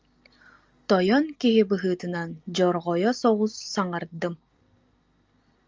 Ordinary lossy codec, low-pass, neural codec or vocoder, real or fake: Opus, 32 kbps; 7.2 kHz; none; real